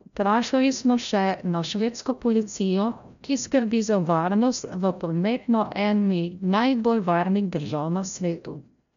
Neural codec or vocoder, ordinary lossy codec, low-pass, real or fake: codec, 16 kHz, 0.5 kbps, FreqCodec, larger model; none; 7.2 kHz; fake